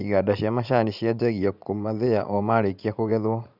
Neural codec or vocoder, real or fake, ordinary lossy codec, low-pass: vocoder, 44.1 kHz, 80 mel bands, Vocos; fake; none; 5.4 kHz